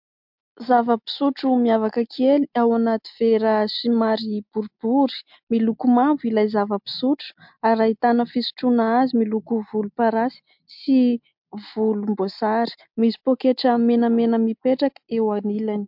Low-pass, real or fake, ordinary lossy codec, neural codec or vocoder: 5.4 kHz; real; MP3, 48 kbps; none